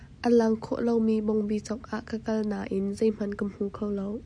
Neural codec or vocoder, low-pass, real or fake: none; 9.9 kHz; real